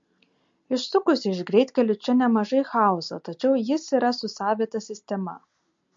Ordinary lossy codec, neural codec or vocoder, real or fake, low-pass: MP3, 48 kbps; none; real; 7.2 kHz